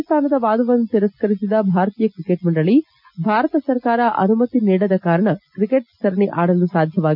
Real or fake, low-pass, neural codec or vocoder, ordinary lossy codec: real; 5.4 kHz; none; none